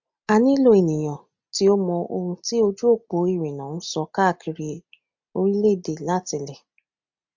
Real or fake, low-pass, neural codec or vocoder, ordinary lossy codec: real; 7.2 kHz; none; MP3, 64 kbps